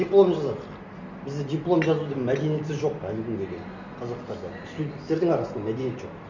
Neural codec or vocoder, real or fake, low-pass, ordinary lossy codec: none; real; 7.2 kHz; none